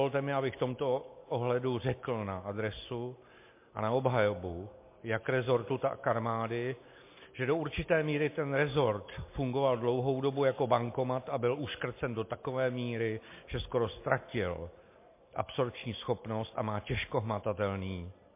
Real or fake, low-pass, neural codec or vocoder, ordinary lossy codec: real; 3.6 kHz; none; MP3, 24 kbps